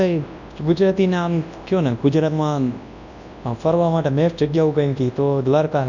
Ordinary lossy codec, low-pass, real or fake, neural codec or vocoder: none; 7.2 kHz; fake; codec, 24 kHz, 0.9 kbps, WavTokenizer, large speech release